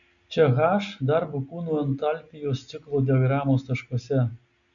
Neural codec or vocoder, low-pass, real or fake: none; 7.2 kHz; real